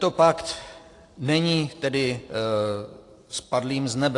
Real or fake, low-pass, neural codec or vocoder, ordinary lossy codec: real; 10.8 kHz; none; AAC, 48 kbps